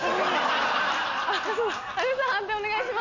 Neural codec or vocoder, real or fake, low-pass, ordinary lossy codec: none; real; 7.2 kHz; none